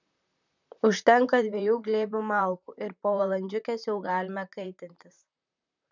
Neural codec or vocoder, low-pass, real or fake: vocoder, 44.1 kHz, 128 mel bands, Pupu-Vocoder; 7.2 kHz; fake